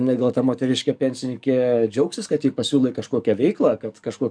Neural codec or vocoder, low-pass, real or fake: codec, 44.1 kHz, 7.8 kbps, DAC; 9.9 kHz; fake